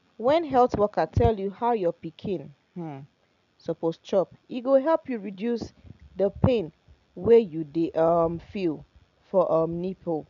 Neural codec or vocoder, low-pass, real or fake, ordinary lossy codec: none; 7.2 kHz; real; none